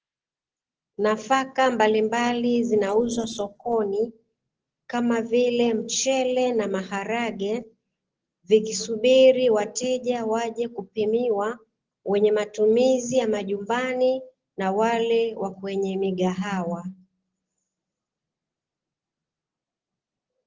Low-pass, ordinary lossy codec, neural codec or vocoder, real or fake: 7.2 kHz; Opus, 16 kbps; none; real